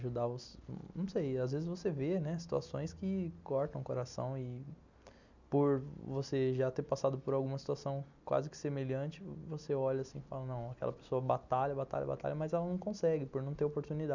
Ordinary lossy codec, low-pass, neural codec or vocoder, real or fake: none; 7.2 kHz; none; real